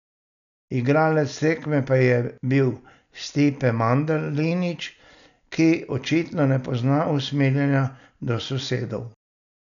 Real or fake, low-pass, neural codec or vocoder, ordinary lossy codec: real; 7.2 kHz; none; none